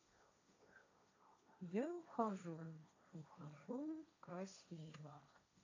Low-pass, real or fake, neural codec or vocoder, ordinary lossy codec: 7.2 kHz; fake; codec, 16 kHz, 1.1 kbps, Voila-Tokenizer; AAC, 48 kbps